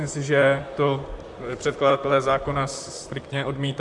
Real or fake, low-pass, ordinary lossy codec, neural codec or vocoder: fake; 10.8 kHz; MP3, 64 kbps; vocoder, 44.1 kHz, 128 mel bands, Pupu-Vocoder